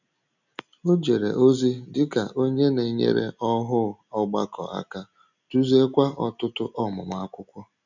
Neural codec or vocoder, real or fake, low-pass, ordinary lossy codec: none; real; 7.2 kHz; none